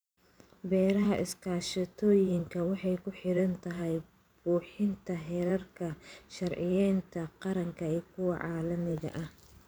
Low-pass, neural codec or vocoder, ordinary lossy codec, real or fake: none; vocoder, 44.1 kHz, 128 mel bands every 512 samples, BigVGAN v2; none; fake